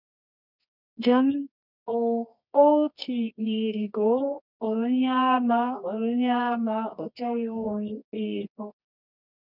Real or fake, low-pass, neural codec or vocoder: fake; 5.4 kHz; codec, 24 kHz, 0.9 kbps, WavTokenizer, medium music audio release